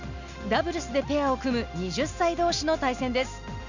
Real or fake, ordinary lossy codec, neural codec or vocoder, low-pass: real; none; none; 7.2 kHz